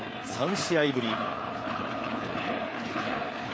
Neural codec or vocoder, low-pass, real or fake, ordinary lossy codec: codec, 16 kHz, 4 kbps, FreqCodec, larger model; none; fake; none